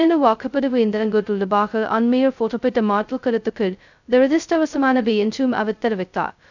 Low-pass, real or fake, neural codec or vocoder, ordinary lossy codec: 7.2 kHz; fake; codec, 16 kHz, 0.2 kbps, FocalCodec; none